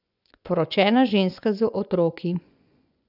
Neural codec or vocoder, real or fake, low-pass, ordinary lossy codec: vocoder, 24 kHz, 100 mel bands, Vocos; fake; 5.4 kHz; none